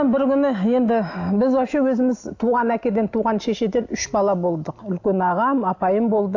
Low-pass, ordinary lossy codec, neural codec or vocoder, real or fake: 7.2 kHz; AAC, 48 kbps; none; real